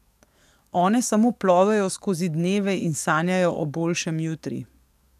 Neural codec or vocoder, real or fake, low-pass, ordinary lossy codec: codec, 44.1 kHz, 7.8 kbps, DAC; fake; 14.4 kHz; none